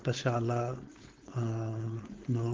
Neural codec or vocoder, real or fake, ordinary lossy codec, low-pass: codec, 16 kHz, 4.8 kbps, FACodec; fake; Opus, 16 kbps; 7.2 kHz